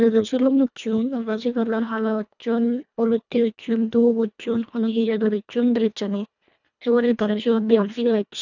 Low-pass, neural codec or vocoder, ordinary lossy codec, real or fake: 7.2 kHz; codec, 24 kHz, 1.5 kbps, HILCodec; none; fake